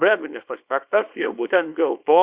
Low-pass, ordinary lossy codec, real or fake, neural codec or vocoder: 3.6 kHz; Opus, 64 kbps; fake; codec, 24 kHz, 0.9 kbps, WavTokenizer, small release